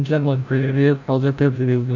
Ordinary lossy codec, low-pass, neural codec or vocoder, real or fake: none; 7.2 kHz; codec, 16 kHz, 0.5 kbps, FreqCodec, larger model; fake